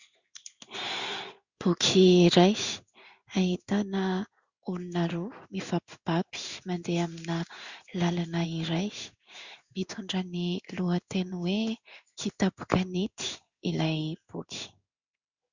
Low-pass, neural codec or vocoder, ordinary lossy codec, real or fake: 7.2 kHz; codec, 16 kHz in and 24 kHz out, 1 kbps, XY-Tokenizer; Opus, 64 kbps; fake